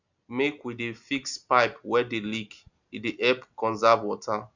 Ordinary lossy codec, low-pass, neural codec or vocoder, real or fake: none; 7.2 kHz; none; real